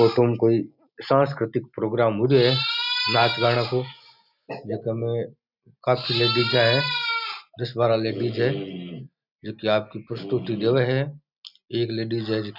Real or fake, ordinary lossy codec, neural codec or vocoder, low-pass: real; none; none; 5.4 kHz